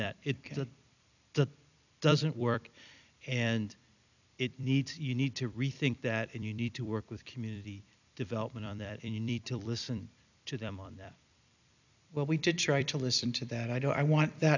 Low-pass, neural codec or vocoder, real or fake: 7.2 kHz; vocoder, 44.1 kHz, 128 mel bands every 256 samples, BigVGAN v2; fake